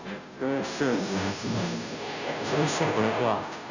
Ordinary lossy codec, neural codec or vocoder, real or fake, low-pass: none; codec, 16 kHz, 0.5 kbps, FunCodec, trained on Chinese and English, 25 frames a second; fake; 7.2 kHz